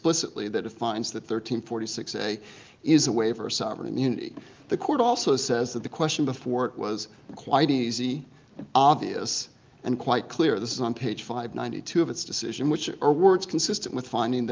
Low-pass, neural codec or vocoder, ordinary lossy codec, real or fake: 7.2 kHz; none; Opus, 32 kbps; real